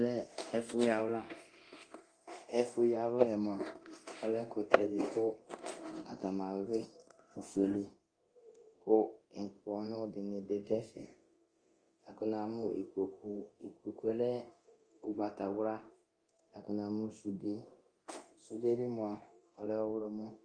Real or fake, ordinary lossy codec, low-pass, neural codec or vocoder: fake; Opus, 24 kbps; 9.9 kHz; codec, 24 kHz, 0.9 kbps, DualCodec